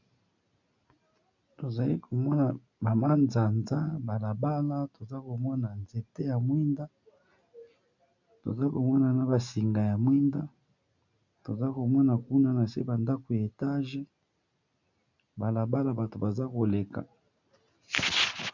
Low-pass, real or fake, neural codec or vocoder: 7.2 kHz; real; none